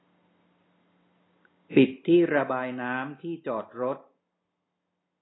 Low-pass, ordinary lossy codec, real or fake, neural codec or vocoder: 7.2 kHz; AAC, 16 kbps; real; none